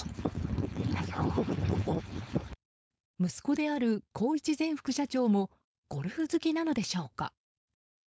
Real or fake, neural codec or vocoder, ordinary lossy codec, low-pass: fake; codec, 16 kHz, 4.8 kbps, FACodec; none; none